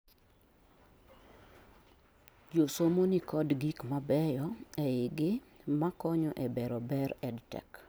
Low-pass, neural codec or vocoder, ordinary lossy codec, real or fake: none; none; none; real